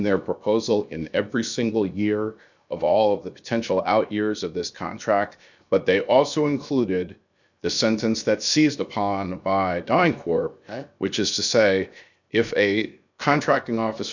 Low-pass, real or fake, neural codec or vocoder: 7.2 kHz; fake; codec, 16 kHz, 0.7 kbps, FocalCodec